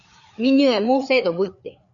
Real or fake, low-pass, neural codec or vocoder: fake; 7.2 kHz; codec, 16 kHz, 4 kbps, FreqCodec, larger model